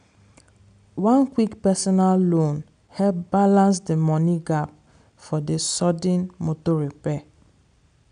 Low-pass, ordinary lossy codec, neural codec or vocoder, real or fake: 9.9 kHz; none; none; real